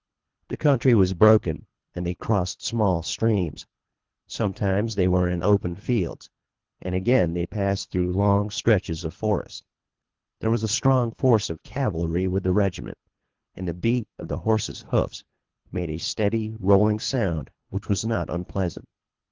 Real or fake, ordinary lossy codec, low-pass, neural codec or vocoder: fake; Opus, 16 kbps; 7.2 kHz; codec, 24 kHz, 3 kbps, HILCodec